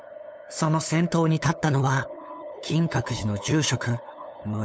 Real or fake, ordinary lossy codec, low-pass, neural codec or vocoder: fake; none; none; codec, 16 kHz, 8 kbps, FunCodec, trained on LibriTTS, 25 frames a second